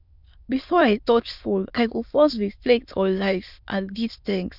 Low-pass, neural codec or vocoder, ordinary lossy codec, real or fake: 5.4 kHz; autoencoder, 22.05 kHz, a latent of 192 numbers a frame, VITS, trained on many speakers; none; fake